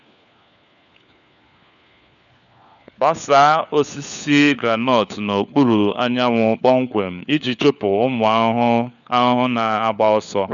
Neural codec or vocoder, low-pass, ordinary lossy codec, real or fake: codec, 16 kHz, 4 kbps, FunCodec, trained on LibriTTS, 50 frames a second; 7.2 kHz; none; fake